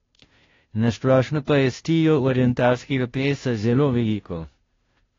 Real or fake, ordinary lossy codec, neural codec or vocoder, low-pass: fake; AAC, 32 kbps; codec, 16 kHz, 0.5 kbps, FunCodec, trained on Chinese and English, 25 frames a second; 7.2 kHz